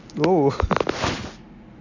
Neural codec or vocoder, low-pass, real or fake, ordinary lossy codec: none; 7.2 kHz; real; none